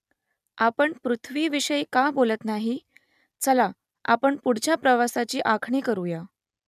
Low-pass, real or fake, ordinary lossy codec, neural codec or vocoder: 14.4 kHz; real; none; none